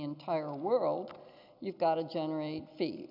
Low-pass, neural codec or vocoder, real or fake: 5.4 kHz; none; real